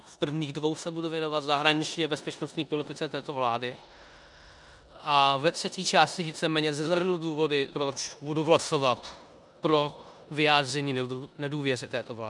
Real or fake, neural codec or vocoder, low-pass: fake; codec, 16 kHz in and 24 kHz out, 0.9 kbps, LongCat-Audio-Codec, four codebook decoder; 10.8 kHz